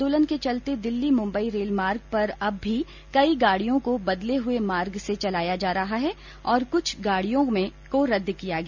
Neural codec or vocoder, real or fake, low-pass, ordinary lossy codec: none; real; 7.2 kHz; none